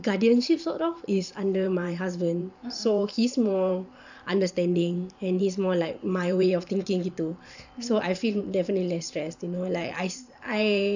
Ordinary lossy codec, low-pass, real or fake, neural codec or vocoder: none; 7.2 kHz; fake; vocoder, 44.1 kHz, 128 mel bands every 512 samples, BigVGAN v2